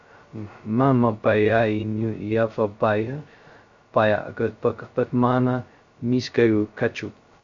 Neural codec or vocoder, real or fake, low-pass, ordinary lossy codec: codec, 16 kHz, 0.2 kbps, FocalCodec; fake; 7.2 kHz; MP3, 48 kbps